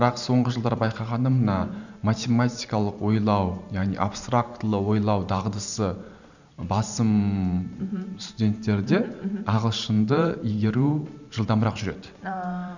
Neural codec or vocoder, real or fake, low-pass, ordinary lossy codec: vocoder, 44.1 kHz, 128 mel bands every 512 samples, BigVGAN v2; fake; 7.2 kHz; none